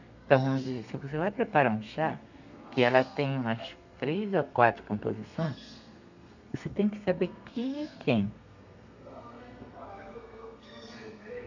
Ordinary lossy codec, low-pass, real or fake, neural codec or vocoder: none; 7.2 kHz; fake; codec, 32 kHz, 1.9 kbps, SNAC